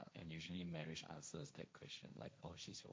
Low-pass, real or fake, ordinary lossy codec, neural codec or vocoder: none; fake; none; codec, 16 kHz, 1.1 kbps, Voila-Tokenizer